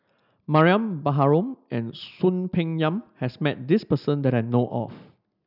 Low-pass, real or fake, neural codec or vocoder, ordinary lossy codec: 5.4 kHz; real; none; none